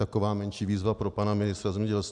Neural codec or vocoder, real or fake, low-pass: none; real; 10.8 kHz